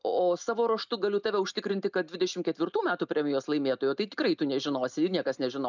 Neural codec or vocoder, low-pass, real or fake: none; 7.2 kHz; real